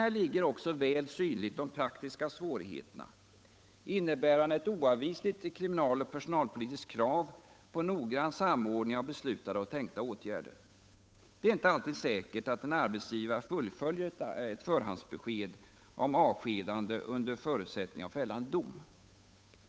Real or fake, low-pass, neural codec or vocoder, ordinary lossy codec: fake; none; codec, 16 kHz, 8 kbps, FunCodec, trained on Chinese and English, 25 frames a second; none